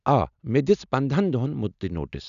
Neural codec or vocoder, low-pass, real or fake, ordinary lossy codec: none; 7.2 kHz; real; none